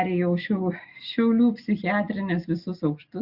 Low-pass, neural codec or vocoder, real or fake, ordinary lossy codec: 5.4 kHz; none; real; Opus, 64 kbps